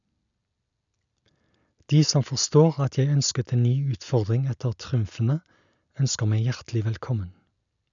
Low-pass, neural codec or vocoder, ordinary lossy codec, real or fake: 7.2 kHz; none; none; real